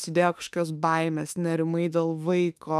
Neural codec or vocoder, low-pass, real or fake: autoencoder, 48 kHz, 32 numbers a frame, DAC-VAE, trained on Japanese speech; 14.4 kHz; fake